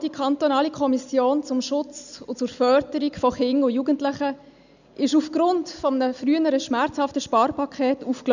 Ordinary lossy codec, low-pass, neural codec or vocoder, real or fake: none; 7.2 kHz; none; real